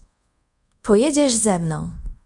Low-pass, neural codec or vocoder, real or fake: 10.8 kHz; codec, 24 kHz, 0.5 kbps, DualCodec; fake